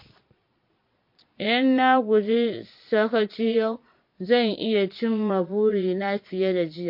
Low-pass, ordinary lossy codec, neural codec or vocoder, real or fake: 5.4 kHz; MP3, 32 kbps; vocoder, 22.05 kHz, 80 mel bands, Vocos; fake